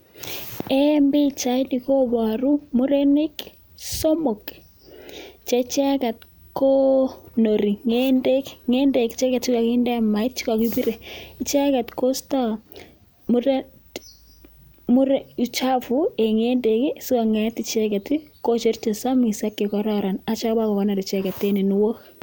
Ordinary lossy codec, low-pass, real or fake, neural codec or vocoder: none; none; real; none